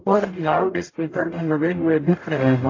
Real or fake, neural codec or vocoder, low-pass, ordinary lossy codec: fake; codec, 44.1 kHz, 0.9 kbps, DAC; 7.2 kHz; AAC, 32 kbps